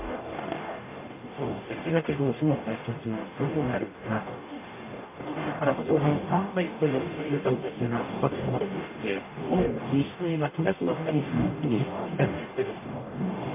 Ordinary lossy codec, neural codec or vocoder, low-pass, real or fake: MP3, 32 kbps; codec, 44.1 kHz, 0.9 kbps, DAC; 3.6 kHz; fake